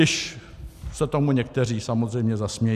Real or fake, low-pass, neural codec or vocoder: real; 14.4 kHz; none